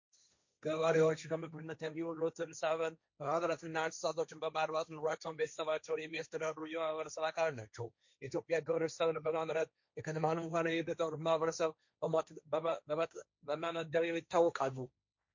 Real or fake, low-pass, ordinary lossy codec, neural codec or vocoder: fake; 7.2 kHz; MP3, 48 kbps; codec, 16 kHz, 1.1 kbps, Voila-Tokenizer